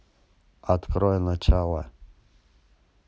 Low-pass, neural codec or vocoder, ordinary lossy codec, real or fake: none; none; none; real